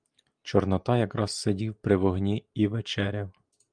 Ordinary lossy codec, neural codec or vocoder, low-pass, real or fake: Opus, 32 kbps; none; 9.9 kHz; real